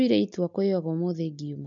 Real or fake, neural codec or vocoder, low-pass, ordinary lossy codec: real; none; 7.2 kHz; MP3, 48 kbps